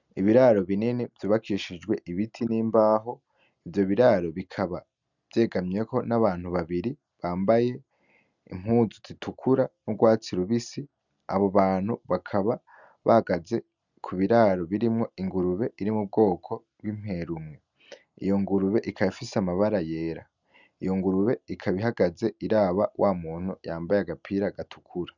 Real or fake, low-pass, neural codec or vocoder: real; 7.2 kHz; none